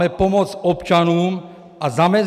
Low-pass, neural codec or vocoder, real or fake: 14.4 kHz; none; real